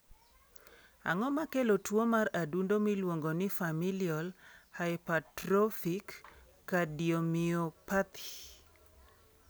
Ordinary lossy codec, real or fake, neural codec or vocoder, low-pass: none; real; none; none